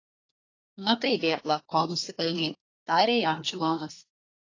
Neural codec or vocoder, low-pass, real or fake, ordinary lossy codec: codec, 24 kHz, 1 kbps, SNAC; 7.2 kHz; fake; AAC, 32 kbps